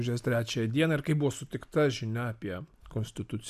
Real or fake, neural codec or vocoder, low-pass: real; none; 14.4 kHz